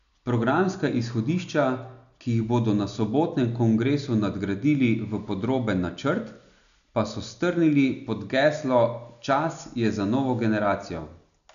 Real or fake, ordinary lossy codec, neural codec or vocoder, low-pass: real; none; none; 7.2 kHz